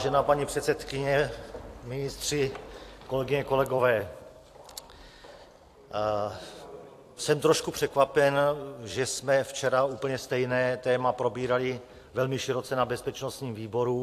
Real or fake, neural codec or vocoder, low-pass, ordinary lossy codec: fake; vocoder, 44.1 kHz, 128 mel bands every 256 samples, BigVGAN v2; 14.4 kHz; AAC, 64 kbps